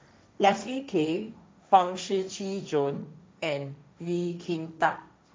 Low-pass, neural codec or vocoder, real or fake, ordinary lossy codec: none; codec, 16 kHz, 1.1 kbps, Voila-Tokenizer; fake; none